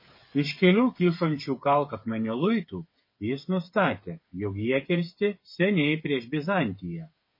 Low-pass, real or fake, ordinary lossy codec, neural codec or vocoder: 5.4 kHz; fake; MP3, 24 kbps; codec, 16 kHz, 8 kbps, FreqCodec, smaller model